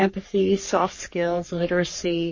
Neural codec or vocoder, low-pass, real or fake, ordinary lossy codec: codec, 44.1 kHz, 3.4 kbps, Pupu-Codec; 7.2 kHz; fake; MP3, 32 kbps